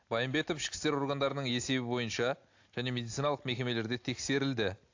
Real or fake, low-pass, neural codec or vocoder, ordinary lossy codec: real; 7.2 kHz; none; AAC, 48 kbps